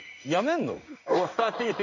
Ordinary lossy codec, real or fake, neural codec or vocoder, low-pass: none; fake; codec, 16 kHz in and 24 kHz out, 1 kbps, XY-Tokenizer; 7.2 kHz